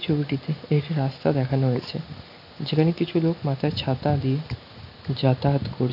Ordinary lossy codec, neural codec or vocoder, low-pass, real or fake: none; none; 5.4 kHz; real